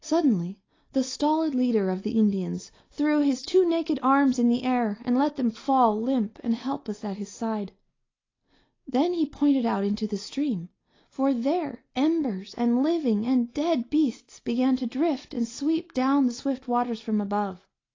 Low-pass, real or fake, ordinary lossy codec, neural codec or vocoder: 7.2 kHz; real; AAC, 32 kbps; none